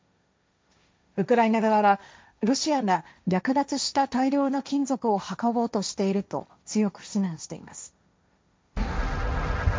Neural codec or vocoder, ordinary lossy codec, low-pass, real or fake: codec, 16 kHz, 1.1 kbps, Voila-Tokenizer; none; none; fake